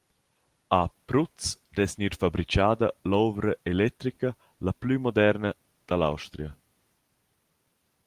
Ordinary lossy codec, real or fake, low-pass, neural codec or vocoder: Opus, 24 kbps; real; 14.4 kHz; none